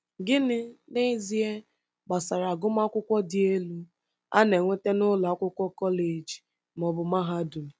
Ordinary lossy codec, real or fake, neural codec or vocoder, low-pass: none; real; none; none